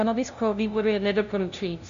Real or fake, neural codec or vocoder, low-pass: fake; codec, 16 kHz, 0.5 kbps, FunCodec, trained on LibriTTS, 25 frames a second; 7.2 kHz